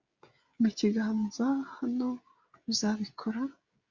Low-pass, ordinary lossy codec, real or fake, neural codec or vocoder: 7.2 kHz; Opus, 64 kbps; fake; codec, 16 kHz, 16 kbps, FreqCodec, smaller model